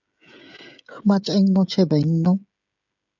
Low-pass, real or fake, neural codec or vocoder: 7.2 kHz; fake; codec, 16 kHz, 16 kbps, FreqCodec, smaller model